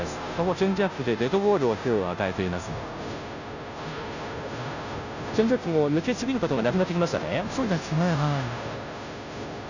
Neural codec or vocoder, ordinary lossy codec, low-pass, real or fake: codec, 16 kHz, 0.5 kbps, FunCodec, trained on Chinese and English, 25 frames a second; none; 7.2 kHz; fake